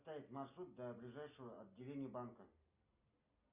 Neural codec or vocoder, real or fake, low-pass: none; real; 3.6 kHz